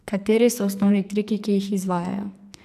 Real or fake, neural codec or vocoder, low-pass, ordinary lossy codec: fake; codec, 44.1 kHz, 2.6 kbps, SNAC; 14.4 kHz; none